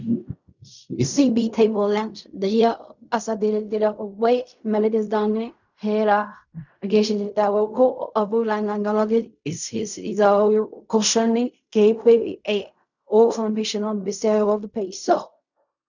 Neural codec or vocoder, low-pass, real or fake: codec, 16 kHz in and 24 kHz out, 0.4 kbps, LongCat-Audio-Codec, fine tuned four codebook decoder; 7.2 kHz; fake